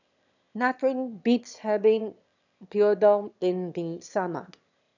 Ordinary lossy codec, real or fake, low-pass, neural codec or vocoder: none; fake; 7.2 kHz; autoencoder, 22.05 kHz, a latent of 192 numbers a frame, VITS, trained on one speaker